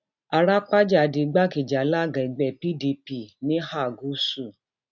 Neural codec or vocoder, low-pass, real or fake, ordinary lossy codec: none; 7.2 kHz; real; none